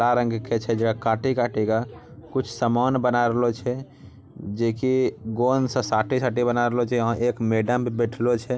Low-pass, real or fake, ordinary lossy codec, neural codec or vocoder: none; real; none; none